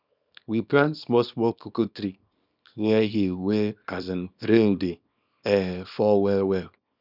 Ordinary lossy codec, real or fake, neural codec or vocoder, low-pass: none; fake; codec, 24 kHz, 0.9 kbps, WavTokenizer, small release; 5.4 kHz